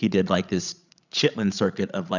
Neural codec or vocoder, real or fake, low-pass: codec, 16 kHz, 16 kbps, FreqCodec, larger model; fake; 7.2 kHz